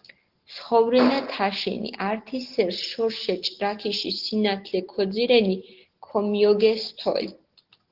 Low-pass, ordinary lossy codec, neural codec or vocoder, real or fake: 5.4 kHz; Opus, 16 kbps; none; real